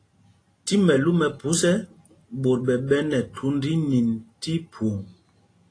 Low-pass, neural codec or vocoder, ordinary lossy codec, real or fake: 9.9 kHz; none; AAC, 32 kbps; real